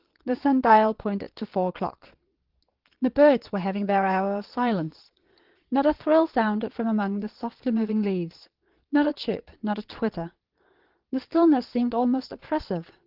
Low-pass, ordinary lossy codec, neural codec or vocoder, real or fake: 5.4 kHz; Opus, 16 kbps; vocoder, 22.05 kHz, 80 mel bands, Vocos; fake